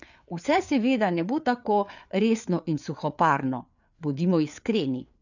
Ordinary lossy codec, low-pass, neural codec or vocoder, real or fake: none; 7.2 kHz; codec, 16 kHz, 4 kbps, FreqCodec, larger model; fake